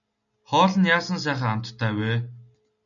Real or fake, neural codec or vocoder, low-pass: real; none; 7.2 kHz